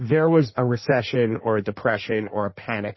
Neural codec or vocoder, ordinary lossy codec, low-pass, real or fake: codec, 16 kHz in and 24 kHz out, 1.1 kbps, FireRedTTS-2 codec; MP3, 24 kbps; 7.2 kHz; fake